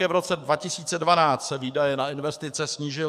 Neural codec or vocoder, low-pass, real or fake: codec, 44.1 kHz, 7.8 kbps, DAC; 14.4 kHz; fake